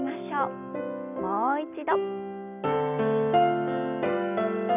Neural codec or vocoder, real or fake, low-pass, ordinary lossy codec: none; real; 3.6 kHz; none